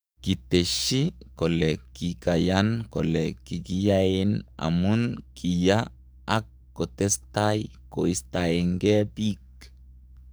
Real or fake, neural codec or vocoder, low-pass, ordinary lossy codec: fake; codec, 44.1 kHz, 7.8 kbps, DAC; none; none